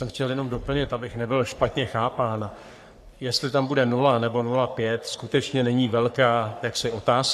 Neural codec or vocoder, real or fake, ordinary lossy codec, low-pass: codec, 44.1 kHz, 3.4 kbps, Pupu-Codec; fake; AAC, 96 kbps; 14.4 kHz